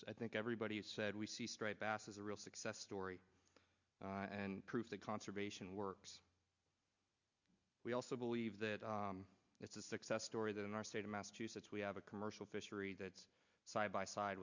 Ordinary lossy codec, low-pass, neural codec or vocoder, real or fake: Opus, 64 kbps; 7.2 kHz; none; real